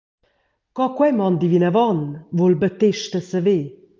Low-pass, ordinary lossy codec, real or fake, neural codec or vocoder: 7.2 kHz; Opus, 24 kbps; real; none